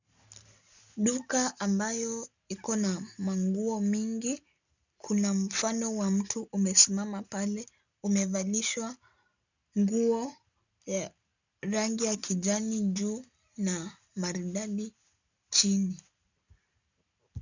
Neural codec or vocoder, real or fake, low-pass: none; real; 7.2 kHz